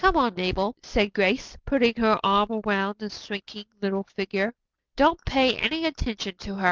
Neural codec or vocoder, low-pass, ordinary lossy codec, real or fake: none; 7.2 kHz; Opus, 32 kbps; real